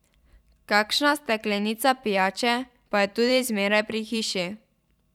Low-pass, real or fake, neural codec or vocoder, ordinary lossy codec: 19.8 kHz; fake; vocoder, 44.1 kHz, 128 mel bands every 512 samples, BigVGAN v2; none